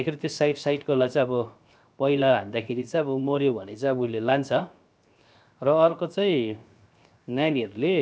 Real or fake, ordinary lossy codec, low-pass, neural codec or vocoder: fake; none; none; codec, 16 kHz, 0.7 kbps, FocalCodec